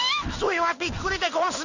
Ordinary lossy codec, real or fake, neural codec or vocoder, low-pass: none; fake; codec, 16 kHz in and 24 kHz out, 1 kbps, XY-Tokenizer; 7.2 kHz